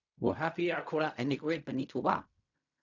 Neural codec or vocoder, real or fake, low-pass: codec, 16 kHz in and 24 kHz out, 0.4 kbps, LongCat-Audio-Codec, fine tuned four codebook decoder; fake; 7.2 kHz